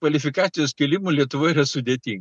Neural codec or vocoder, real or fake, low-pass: none; real; 10.8 kHz